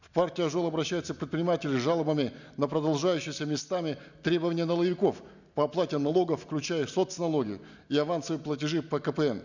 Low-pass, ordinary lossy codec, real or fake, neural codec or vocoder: 7.2 kHz; none; real; none